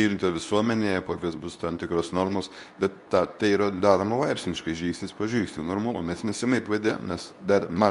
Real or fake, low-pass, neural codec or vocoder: fake; 10.8 kHz; codec, 24 kHz, 0.9 kbps, WavTokenizer, medium speech release version 2